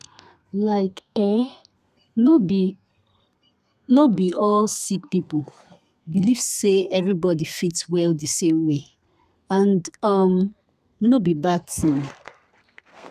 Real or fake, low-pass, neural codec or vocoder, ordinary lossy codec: fake; 14.4 kHz; codec, 32 kHz, 1.9 kbps, SNAC; none